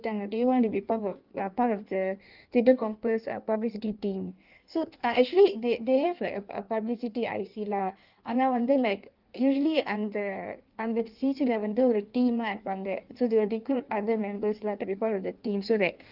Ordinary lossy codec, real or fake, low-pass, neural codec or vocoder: Opus, 32 kbps; fake; 5.4 kHz; codec, 16 kHz in and 24 kHz out, 1.1 kbps, FireRedTTS-2 codec